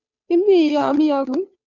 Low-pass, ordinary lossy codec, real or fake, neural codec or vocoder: 7.2 kHz; Opus, 64 kbps; fake; codec, 16 kHz, 2 kbps, FunCodec, trained on Chinese and English, 25 frames a second